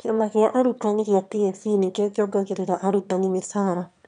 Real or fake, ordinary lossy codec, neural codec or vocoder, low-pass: fake; none; autoencoder, 22.05 kHz, a latent of 192 numbers a frame, VITS, trained on one speaker; 9.9 kHz